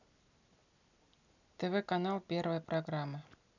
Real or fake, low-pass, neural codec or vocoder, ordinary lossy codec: real; 7.2 kHz; none; none